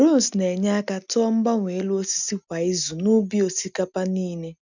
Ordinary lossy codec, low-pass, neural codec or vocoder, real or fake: none; 7.2 kHz; none; real